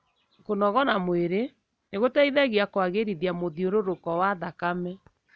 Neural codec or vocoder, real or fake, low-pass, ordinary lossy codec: none; real; none; none